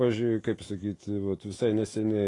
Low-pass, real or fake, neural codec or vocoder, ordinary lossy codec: 10.8 kHz; fake; autoencoder, 48 kHz, 128 numbers a frame, DAC-VAE, trained on Japanese speech; AAC, 32 kbps